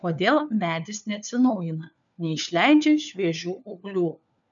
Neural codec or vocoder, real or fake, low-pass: codec, 16 kHz, 4 kbps, FunCodec, trained on LibriTTS, 50 frames a second; fake; 7.2 kHz